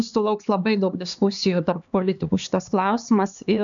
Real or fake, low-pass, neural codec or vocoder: fake; 7.2 kHz; codec, 16 kHz, 2 kbps, X-Codec, HuBERT features, trained on LibriSpeech